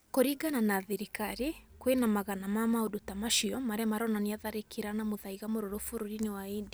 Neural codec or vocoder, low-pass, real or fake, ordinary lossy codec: none; none; real; none